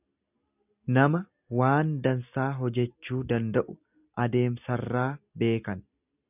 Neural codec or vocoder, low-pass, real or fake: none; 3.6 kHz; real